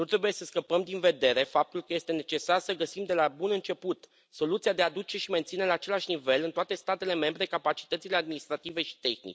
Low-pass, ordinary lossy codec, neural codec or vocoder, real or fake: none; none; none; real